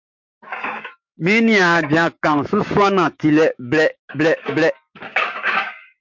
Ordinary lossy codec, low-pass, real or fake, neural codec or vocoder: MP3, 48 kbps; 7.2 kHz; fake; codec, 16 kHz, 6 kbps, DAC